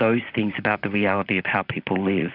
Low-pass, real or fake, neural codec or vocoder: 5.4 kHz; fake; vocoder, 44.1 kHz, 128 mel bands, Pupu-Vocoder